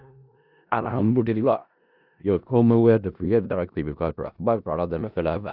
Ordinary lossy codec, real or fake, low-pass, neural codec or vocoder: Opus, 64 kbps; fake; 5.4 kHz; codec, 16 kHz in and 24 kHz out, 0.4 kbps, LongCat-Audio-Codec, four codebook decoder